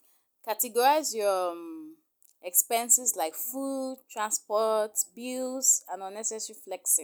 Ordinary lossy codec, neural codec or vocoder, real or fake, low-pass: none; none; real; none